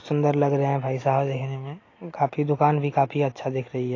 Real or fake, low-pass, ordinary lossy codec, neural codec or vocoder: real; 7.2 kHz; AAC, 32 kbps; none